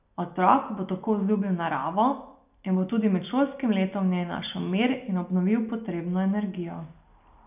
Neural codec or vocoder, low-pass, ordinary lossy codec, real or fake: none; 3.6 kHz; none; real